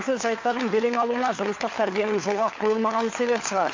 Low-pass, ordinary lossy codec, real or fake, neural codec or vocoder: 7.2 kHz; MP3, 48 kbps; fake; codec, 16 kHz, 4 kbps, FunCodec, trained on LibriTTS, 50 frames a second